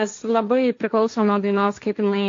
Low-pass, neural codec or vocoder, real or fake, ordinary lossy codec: 7.2 kHz; codec, 16 kHz, 1.1 kbps, Voila-Tokenizer; fake; AAC, 48 kbps